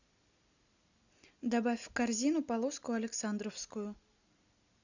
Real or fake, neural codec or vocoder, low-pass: real; none; 7.2 kHz